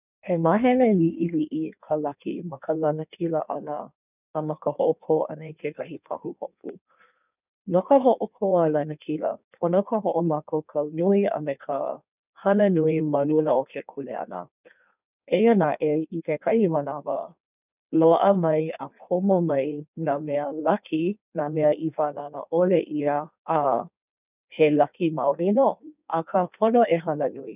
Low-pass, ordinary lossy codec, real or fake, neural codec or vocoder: 3.6 kHz; none; fake; codec, 16 kHz in and 24 kHz out, 1.1 kbps, FireRedTTS-2 codec